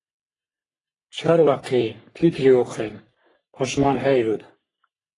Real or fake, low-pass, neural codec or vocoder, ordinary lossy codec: fake; 10.8 kHz; codec, 44.1 kHz, 3.4 kbps, Pupu-Codec; AAC, 32 kbps